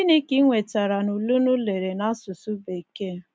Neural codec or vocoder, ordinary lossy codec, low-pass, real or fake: none; none; none; real